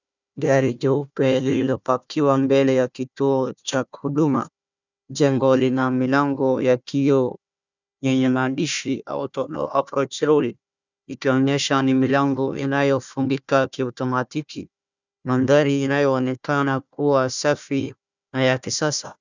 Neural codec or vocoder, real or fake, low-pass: codec, 16 kHz, 1 kbps, FunCodec, trained on Chinese and English, 50 frames a second; fake; 7.2 kHz